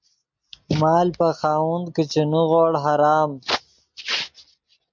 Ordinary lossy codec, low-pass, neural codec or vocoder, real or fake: MP3, 64 kbps; 7.2 kHz; none; real